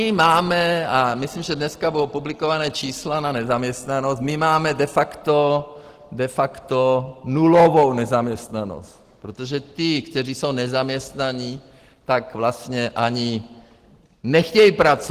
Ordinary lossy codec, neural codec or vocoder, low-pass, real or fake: Opus, 16 kbps; none; 14.4 kHz; real